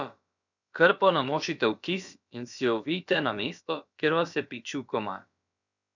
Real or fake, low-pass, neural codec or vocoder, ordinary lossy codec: fake; 7.2 kHz; codec, 16 kHz, about 1 kbps, DyCAST, with the encoder's durations; none